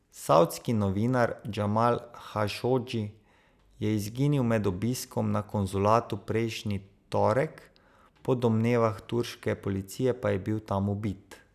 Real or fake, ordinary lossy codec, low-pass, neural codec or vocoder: real; none; 14.4 kHz; none